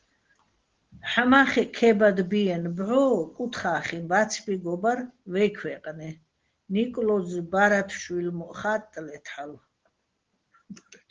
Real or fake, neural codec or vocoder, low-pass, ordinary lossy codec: real; none; 7.2 kHz; Opus, 16 kbps